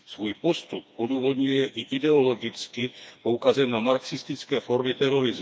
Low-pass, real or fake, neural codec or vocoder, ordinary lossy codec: none; fake; codec, 16 kHz, 2 kbps, FreqCodec, smaller model; none